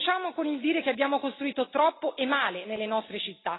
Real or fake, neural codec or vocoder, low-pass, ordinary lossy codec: real; none; 7.2 kHz; AAC, 16 kbps